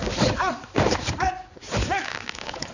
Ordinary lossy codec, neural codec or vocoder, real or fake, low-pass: none; codec, 16 kHz, 4 kbps, X-Codec, HuBERT features, trained on balanced general audio; fake; 7.2 kHz